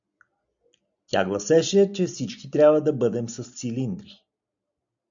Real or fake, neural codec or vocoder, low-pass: real; none; 7.2 kHz